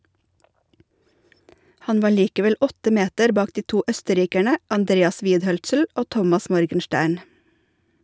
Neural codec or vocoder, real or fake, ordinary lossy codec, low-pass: none; real; none; none